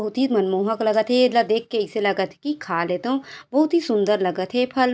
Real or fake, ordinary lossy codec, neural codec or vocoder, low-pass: real; none; none; none